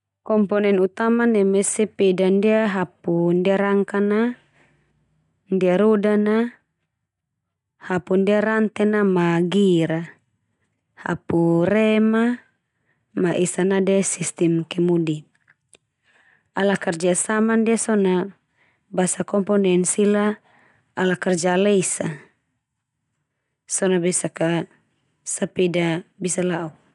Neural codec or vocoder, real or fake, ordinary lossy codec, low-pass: none; real; none; 10.8 kHz